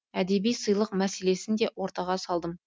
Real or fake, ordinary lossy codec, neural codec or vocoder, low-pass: real; none; none; none